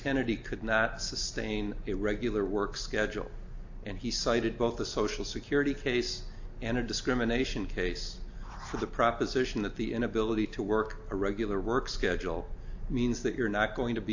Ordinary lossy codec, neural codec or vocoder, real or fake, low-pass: AAC, 48 kbps; none; real; 7.2 kHz